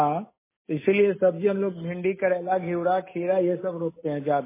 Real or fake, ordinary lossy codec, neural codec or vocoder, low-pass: real; MP3, 16 kbps; none; 3.6 kHz